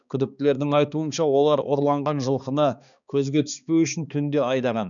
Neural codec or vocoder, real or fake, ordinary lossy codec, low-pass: codec, 16 kHz, 2 kbps, X-Codec, HuBERT features, trained on balanced general audio; fake; none; 7.2 kHz